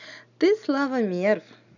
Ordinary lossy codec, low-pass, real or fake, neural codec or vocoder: none; 7.2 kHz; real; none